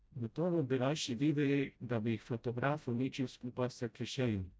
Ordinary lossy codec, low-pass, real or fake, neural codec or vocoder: none; none; fake; codec, 16 kHz, 0.5 kbps, FreqCodec, smaller model